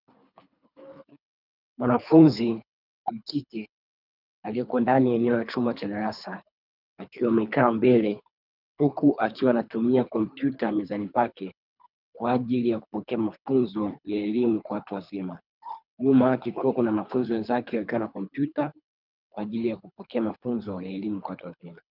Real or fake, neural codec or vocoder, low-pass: fake; codec, 24 kHz, 3 kbps, HILCodec; 5.4 kHz